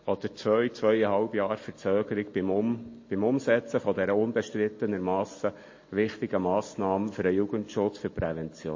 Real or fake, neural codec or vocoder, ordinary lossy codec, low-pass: real; none; MP3, 32 kbps; 7.2 kHz